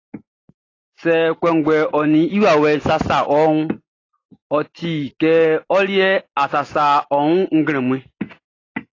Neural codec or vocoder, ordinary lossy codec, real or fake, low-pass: none; AAC, 32 kbps; real; 7.2 kHz